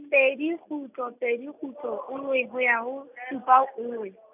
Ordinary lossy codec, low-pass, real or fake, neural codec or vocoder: none; 3.6 kHz; real; none